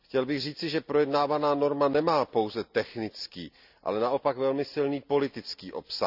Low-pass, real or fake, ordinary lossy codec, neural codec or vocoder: 5.4 kHz; real; none; none